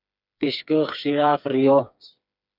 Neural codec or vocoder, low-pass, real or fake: codec, 16 kHz, 4 kbps, FreqCodec, smaller model; 5.4 kHz; fake